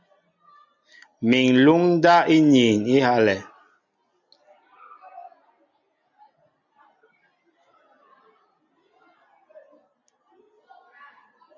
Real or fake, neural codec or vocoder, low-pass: real; none; 7.2 kHz